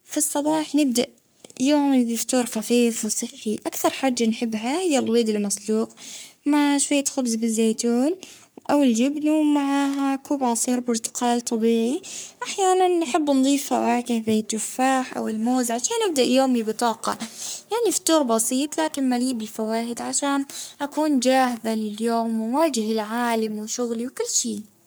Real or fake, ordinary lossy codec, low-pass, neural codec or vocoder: fake; none; none; codec, 44.1 kHz, 3.4 kbps, Pupu-Codec